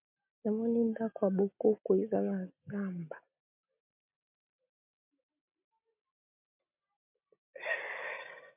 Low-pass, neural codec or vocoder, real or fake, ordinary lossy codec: 3.6 kHz; none; real; MP3, 32 kbps